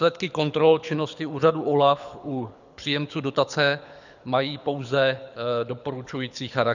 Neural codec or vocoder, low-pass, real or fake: codec, 24 kHz, 6 kbps, HILCodec; 7.2 kHz; fake